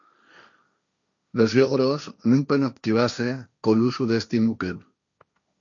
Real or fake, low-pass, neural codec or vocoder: fake; 7.2 kHz; codec, 16 kHz, 1.1 kbps, Voila-Tokenizer